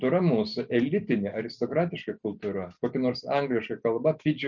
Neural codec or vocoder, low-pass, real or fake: none; 7.2 kHz; real